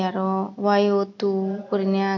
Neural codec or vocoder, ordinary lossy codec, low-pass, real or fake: none; none; 7.2 kHz; real